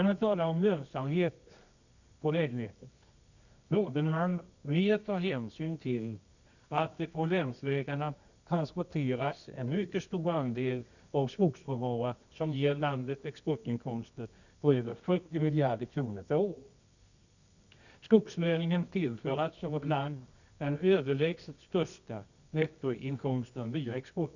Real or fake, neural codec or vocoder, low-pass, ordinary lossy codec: fake; codec, 24 kHz, 0.9 kbps, WavTokenizer, medium music audio release; 7.2 kHz; none